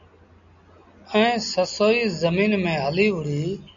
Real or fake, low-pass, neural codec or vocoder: real; 7.2 kHz; none